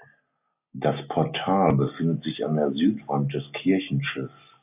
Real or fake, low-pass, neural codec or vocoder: real; 3.6 kHz; none